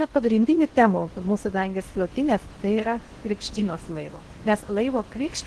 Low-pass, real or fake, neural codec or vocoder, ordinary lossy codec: 10.8 kHz; fake; codec, 16 kHz in and 24 kHz out, 0.6 kbps, FocalCodec, streaming, 2048 codes; Opus, 16 kbps